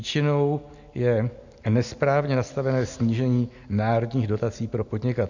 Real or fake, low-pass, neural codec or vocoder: real; 7.2 kHz; none